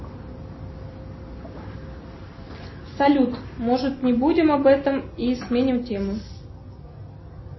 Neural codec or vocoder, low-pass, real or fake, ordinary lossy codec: none; 7.2 kHz; real; MP3, 24 kbps